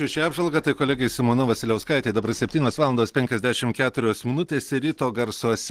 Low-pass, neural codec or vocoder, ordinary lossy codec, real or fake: 14.4 kHz; none; Opus, 16 kbps; real